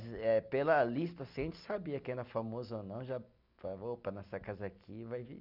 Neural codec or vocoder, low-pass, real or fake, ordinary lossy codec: none; 5.4 kHz; real; none